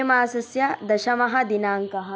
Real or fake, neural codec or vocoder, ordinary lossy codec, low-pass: real; none; none; none